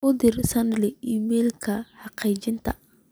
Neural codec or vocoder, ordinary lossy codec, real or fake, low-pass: none; none; real; none